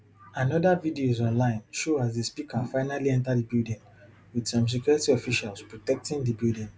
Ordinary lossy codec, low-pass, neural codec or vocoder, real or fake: none; none; none; real